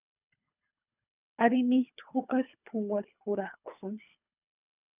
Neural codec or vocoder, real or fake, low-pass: codec, 24 kHz, 3 kbps, HILCodec; fake; 3.6 kHz